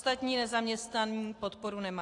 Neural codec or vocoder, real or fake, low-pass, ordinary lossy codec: none; real; 10.8 kHz; AAC, 48 kbps